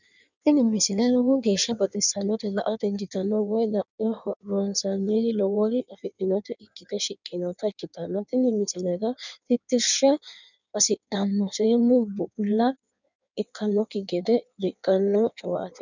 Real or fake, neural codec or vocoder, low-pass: fake; codec, 16 kHz in and 24 kHz out, 1.1 kbps, FireRedTTS-2 codec; 7.2 kHz